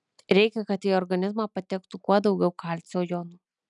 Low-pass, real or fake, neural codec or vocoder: 10.8 kHz; real; none